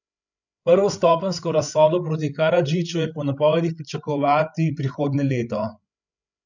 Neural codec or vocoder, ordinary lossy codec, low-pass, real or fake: codec, 16 kHz, 8 kbps, FreqCodec, larger model; none; 7.2 kHz; fake